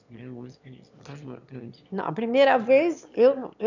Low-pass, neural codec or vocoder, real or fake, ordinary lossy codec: 7.2 kHz; autoencoder, 22.05 kHz, a latent of 192 numbers a frame, VITS, trained on one speaker; fake; none